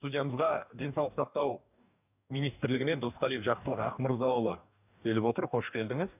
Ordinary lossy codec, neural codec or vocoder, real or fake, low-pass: none; codec, 44.1 kHz, 2.6 kbps, DAC; fake; 3.6 kHz